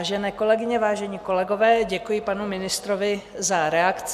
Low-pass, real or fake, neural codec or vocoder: 14.4 kHz; real; none